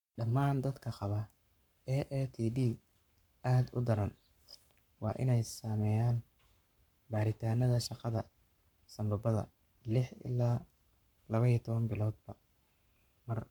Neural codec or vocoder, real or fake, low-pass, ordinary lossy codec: codec, 44.1 kHz, 7.8 kbps, Pupu-Codec; fake; 19.8 kHz; none